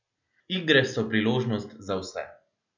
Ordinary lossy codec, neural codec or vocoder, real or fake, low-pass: none; none; real; 7.2 kHz